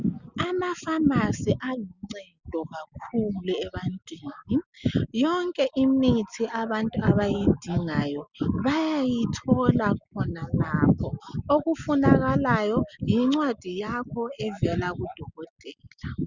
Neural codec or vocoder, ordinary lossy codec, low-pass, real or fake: none; Opus, 64 kbps; 7.2 kHz; real